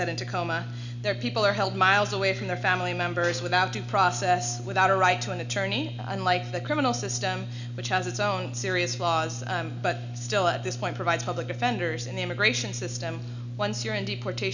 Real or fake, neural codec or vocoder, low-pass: real; none; 7.2 kHz